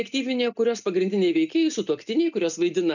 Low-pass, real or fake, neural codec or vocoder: 7.2 kHz; real; none